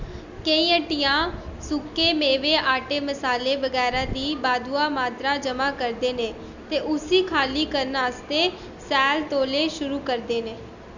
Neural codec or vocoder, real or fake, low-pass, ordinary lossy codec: none; real; 7.2 kHz; none